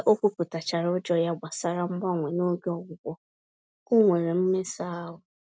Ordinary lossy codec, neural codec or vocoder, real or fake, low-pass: none; none; real; none